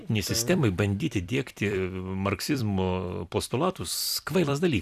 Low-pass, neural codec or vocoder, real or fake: 14.4 kHz; vocoder, 44.1 kHz, 128 mel bands every 256 samples, BigVGAN v2; fake